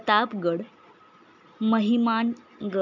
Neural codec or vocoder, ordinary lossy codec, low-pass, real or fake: none; none; 7.2 kHz; real